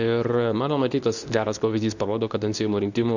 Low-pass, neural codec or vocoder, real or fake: 7.2 kHz; codec, 24 kHz, 0.9 kbps, WavTokenizer, medium speech release version 2; fake